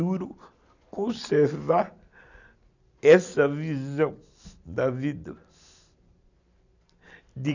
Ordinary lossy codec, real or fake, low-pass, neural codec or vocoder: none; real; 7.2 kHz; none